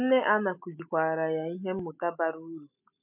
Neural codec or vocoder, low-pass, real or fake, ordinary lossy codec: none; 3.6 kHz; real; MP3, 32 kbps